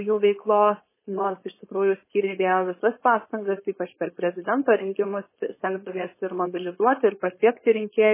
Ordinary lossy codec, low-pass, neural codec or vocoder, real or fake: MP3, 16 kbps; 3.6 kHz; codec, 16 kHz, 4.8 kbps, FACodec; fake